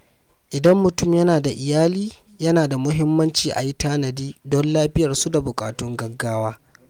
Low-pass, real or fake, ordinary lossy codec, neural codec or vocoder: 19.8 kHz; real; Opus, 32 kbps; none